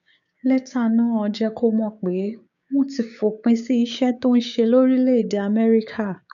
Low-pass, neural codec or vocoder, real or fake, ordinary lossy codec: 7.2 kHz; codec, 16 kHz, 6 kbps, DAC; fake; none